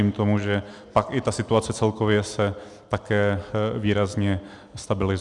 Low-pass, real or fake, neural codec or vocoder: 10.8 kHz; real; none